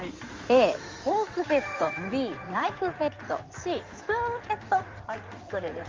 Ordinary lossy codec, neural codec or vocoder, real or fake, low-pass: Opus, 32 kbps; codec, 16 kHz in and 24 kHz out, 2.2 kbps, FireRedTTS-2 codec; fake; 7.2 kHz